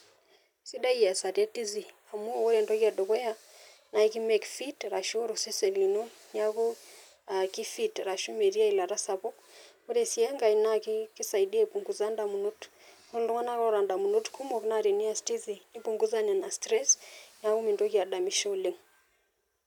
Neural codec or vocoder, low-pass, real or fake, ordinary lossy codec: none; 19.8 kHz; real; none